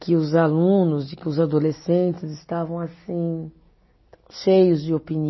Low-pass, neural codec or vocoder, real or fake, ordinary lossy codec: 7.2 kHz; none; real; MP3, 24 kbps